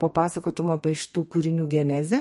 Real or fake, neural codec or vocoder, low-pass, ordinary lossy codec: fake; codec, 32 kHz, 1.9 kbps, SNAC; 14.4 kHz; MP3, 48 kbps